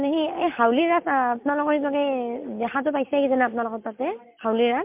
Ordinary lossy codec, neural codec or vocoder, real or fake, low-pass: MP3, 32 kbps; none; real; 3.6 kHz